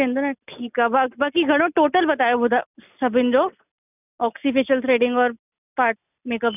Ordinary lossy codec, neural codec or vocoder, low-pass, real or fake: none; none; 3.6 kHz; real